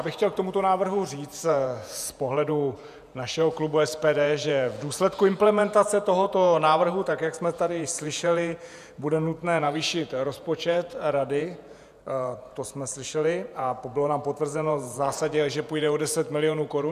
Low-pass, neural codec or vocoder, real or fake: 14.4 kHz; vocoder, 48 kHz, 128 mel bands, Vocos; fake